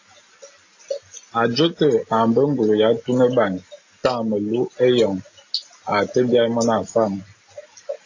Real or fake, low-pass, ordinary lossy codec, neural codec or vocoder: real; 7.2 kHz; AAC, 48 kbps; none